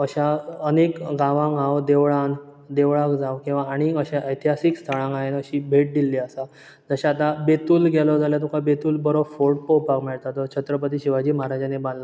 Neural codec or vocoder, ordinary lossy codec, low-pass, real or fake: none; none; none; real